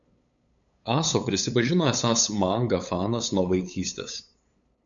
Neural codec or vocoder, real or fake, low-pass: codec, 16 kHz, 8 kbps, FunCodec, trained on LibriTTS, 25 frames a second; fake; 7.2 kHz